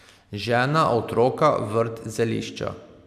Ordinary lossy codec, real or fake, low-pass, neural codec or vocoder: none; real; 14.4 kHz; none